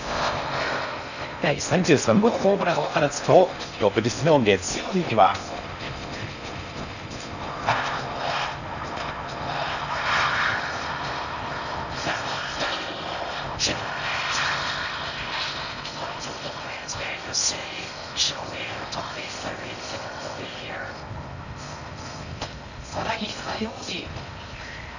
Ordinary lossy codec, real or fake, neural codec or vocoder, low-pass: none; fake; codec, 16 kHz in and 24 kHz out, 0.6 kbps, FocalCodec, streaming, 4096 codes; 7.2 kHz